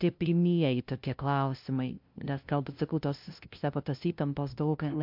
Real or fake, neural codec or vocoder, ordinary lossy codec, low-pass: fake; codec, 16 kHz, 0.5 kbps, FunCodec, trained on LibriTTS, 25 frames a second; MP3, 48 kbps; 5.4 kHz